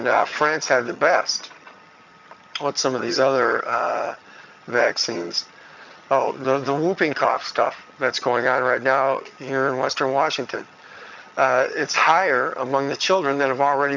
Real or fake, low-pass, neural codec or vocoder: fake; 7.2 kHz; vocoder, 22.05 kHz, 80 mel bands, HiFi-GAN